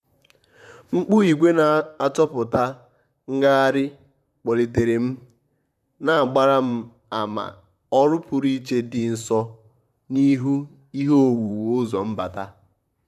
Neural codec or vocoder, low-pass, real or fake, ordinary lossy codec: vocoder, 44.1 kHz, 128 mel bands, Pupu-Vocoder; 14.4 kHz; fake; none